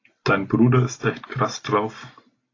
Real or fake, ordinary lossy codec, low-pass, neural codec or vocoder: real; AAC, 32 kbps; 7.2 kHz; none